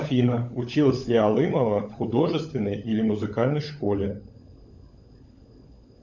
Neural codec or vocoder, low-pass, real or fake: codec, 16 kHz, 16 kbps, FunCodec, trained on LibriTTS, 50 frames a second; 7.2 kHz; fake